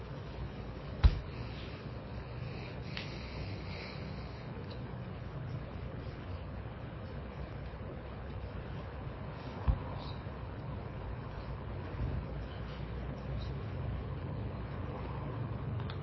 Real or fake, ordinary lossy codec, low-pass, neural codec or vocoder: fake; MP3, 24 kbps; 7.2 kHz; codec, 24 kHz, 6 kbps, HILCodec